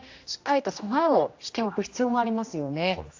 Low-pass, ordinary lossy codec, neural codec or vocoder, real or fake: 7.2 kHz; AAC, 48 kbps; codec, 16 kHz, 1 kbps, X-Codec, HuBERT features, trained on general audio; fake